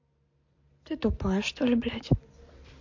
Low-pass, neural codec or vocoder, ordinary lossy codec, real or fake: 7.2 kHz; none; MP3, 48 kbps; real